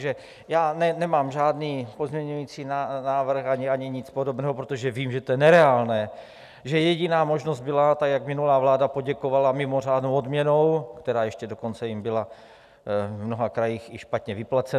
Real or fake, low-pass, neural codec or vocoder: real; 14.4 kHz; none